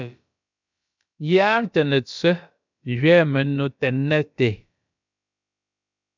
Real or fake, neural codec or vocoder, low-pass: fake; codec, 16 kHz, about 1 kbps, DyCAST, with the encoder's durations; 7.2 kHz